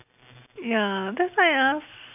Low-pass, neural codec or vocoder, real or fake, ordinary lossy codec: 3.6 kHz; none; real; none